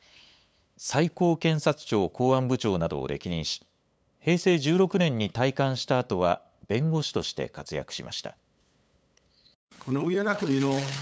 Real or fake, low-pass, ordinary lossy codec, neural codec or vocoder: fake; none; none; codec, 16 kHz, 8 kbps, FunCodec, trained on LibriTTS, 25 frames a second